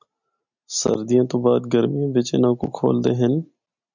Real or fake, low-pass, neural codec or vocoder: real; 7.2 kHz; none